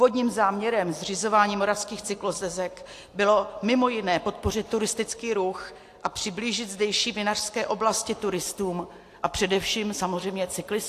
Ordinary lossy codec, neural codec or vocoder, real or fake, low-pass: AAC, 64 kbps; none; real; 14.4 kHz